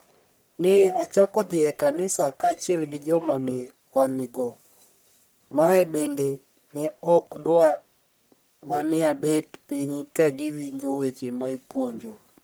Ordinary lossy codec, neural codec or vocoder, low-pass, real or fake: none; codec, 44.1 kHz, 1.7 kbps, Pupu-Codec; none; fake